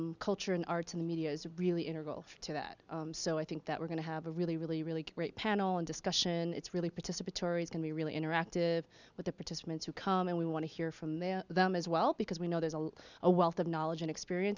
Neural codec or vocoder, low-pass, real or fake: none; 7.2 kHz; real